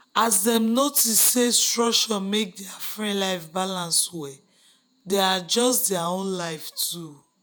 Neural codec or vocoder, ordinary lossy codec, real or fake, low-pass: vocoder, 48 kHz, 128 mel bands, Vocos; none; fake; none